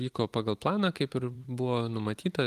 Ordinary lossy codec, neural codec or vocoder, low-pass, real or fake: Opus, 24 kbps; vocoder, 44.1 kHz, 128 mel bands every 256 samples, BigVGAN v2; 14.4 kHz; fake